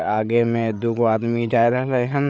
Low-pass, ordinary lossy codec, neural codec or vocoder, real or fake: none; none; codec, 16 kHz, 8 kbps, FreqCodec, larger model; fake